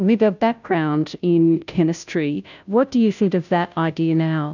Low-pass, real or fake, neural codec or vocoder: 7.2 kHz; fake; codec, 16 kHz, 0.5 kbps, FunCodec, trained on Chinese and English, 25 frames a second